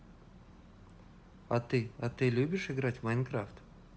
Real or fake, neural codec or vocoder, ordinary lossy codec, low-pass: real; none; none; none